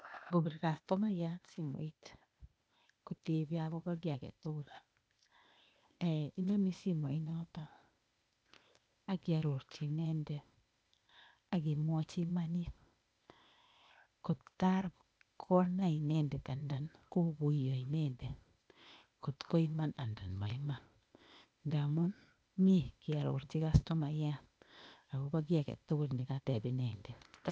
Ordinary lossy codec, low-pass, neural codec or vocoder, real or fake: none; none; codec, 16 kHz, 0.8 kbps, ZipCodec; fake